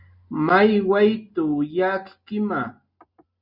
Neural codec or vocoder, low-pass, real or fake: none; 5.4 kHz; real